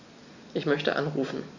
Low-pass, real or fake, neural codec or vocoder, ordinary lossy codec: 7.2 kHz; real; none; Opus, 64 kbps